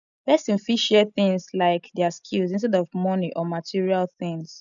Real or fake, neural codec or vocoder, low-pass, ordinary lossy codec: real; none; 7.2 kHz; none